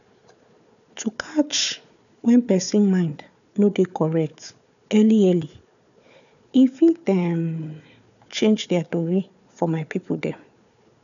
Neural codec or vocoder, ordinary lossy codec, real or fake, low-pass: codec, 16 kHz, 4 kbps, FunCodec, trained on Chinese and English, 50 frames a second; none; fake; 7.2 kHz